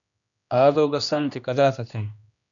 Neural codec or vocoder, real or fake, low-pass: codec, 16 kHz, 1 kbps, X-Codec, HuBERT features, trained on general audio; fake; 7.2 kHz